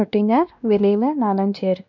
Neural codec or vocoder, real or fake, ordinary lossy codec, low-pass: codec, 16 kHz, 1 kbps, X-Codec, WavLM features, trained on Multilingual LibriSpeech; fake; none; 7.2 kHz